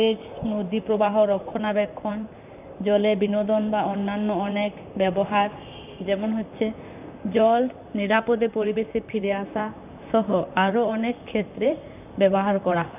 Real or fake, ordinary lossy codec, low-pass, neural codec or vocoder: fake; none; 3.6 kHz; vocoder, 44.1 kHz, 128 mel bands, Pupu-Vocoder